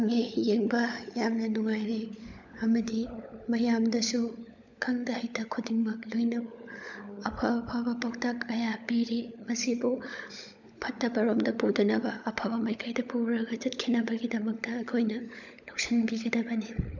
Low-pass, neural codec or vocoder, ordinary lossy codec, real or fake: 7.2 kHz; codec, 16 kHz, 16 kbps, FunCodec, trained on LibriTTS, 50 frames a second; none; fake